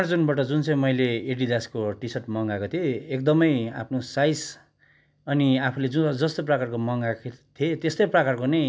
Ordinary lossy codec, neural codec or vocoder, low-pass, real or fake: none; none; none; real